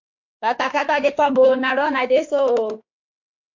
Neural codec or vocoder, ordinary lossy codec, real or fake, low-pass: autoencoder, 48 kHz, 32 numbers a frame, DAC-VAE, trained on Japanese speech; MP3, 48 kbps; fake; 7.2 kHz